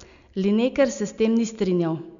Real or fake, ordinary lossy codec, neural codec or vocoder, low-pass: real; none; none; 7.2 kHz